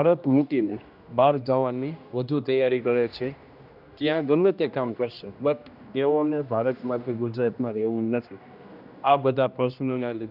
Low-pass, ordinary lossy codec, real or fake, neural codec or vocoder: 5.4 kHz; none; fake; codec, 16 kHz, 1 kbps, X-Codec, HuBERT features, trained on general audio